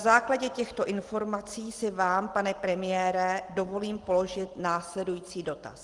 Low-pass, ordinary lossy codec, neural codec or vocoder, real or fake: 10.8 kHz; Opus, 24 kbps; none; real